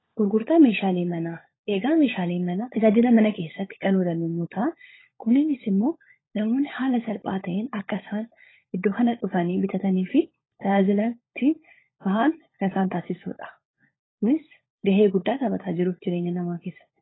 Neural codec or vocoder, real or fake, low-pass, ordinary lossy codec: codec, 16 kHz, 16 kbps, FunCodec, trained on LibriTTS, 50 frames a second; fake; 7.2 kHz; AAC, 16 kbps